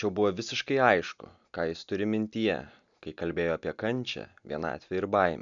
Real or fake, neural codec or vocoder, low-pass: real; none; 7.2 kHz